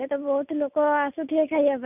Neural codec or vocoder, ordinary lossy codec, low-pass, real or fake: none; none; 3.6 kHz; real